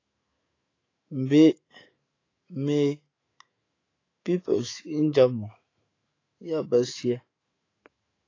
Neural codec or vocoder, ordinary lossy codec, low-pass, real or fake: autoencoder, 48 kHz, 128 numbers a frame, DAC-VAE, trained on Japanese speech; AAC, 32 kbps; 7.2 kHz; fake